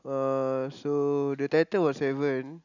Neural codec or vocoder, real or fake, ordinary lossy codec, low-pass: none; real; none; 7.2 kHz